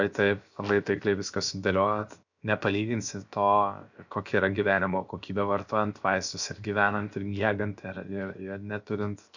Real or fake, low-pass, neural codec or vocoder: fake; 7.2 kHz; codec, 16 kHz, 0.7 kbps, FocalCodec